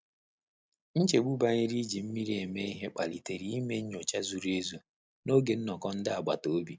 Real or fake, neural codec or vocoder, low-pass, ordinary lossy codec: real; none; none; none